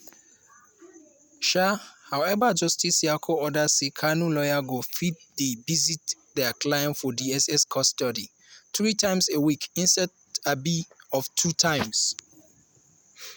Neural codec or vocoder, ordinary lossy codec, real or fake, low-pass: none; none; real; none